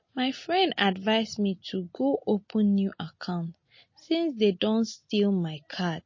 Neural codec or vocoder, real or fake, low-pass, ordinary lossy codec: none; real; 7.2 kHz; MP3, 32 kbps